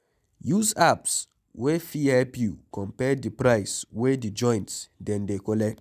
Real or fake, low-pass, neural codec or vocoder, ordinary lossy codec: real; 14.4 kHz; none; none